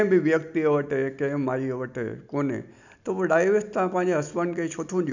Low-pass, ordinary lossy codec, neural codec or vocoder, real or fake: 7.2 kHz; none; none; real